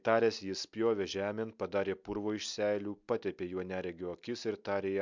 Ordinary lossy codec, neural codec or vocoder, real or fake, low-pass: MP3, 64 kbps; none; real; 7.2 kHz